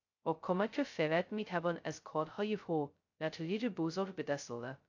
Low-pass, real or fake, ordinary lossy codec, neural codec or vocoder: 7.2 kHz; fake; AAC, 48 kbps; codec, 16 kHz, 0.2 kbps, FocalCodec